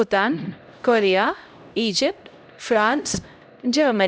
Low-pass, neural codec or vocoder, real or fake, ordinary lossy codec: none; codec, 16 kHz, 0.5 kbps, X-Codec, HuBERT features, trained on LibriSpeech; fake; none